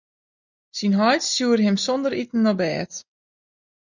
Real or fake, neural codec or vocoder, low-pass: real; none; 7.2 kHz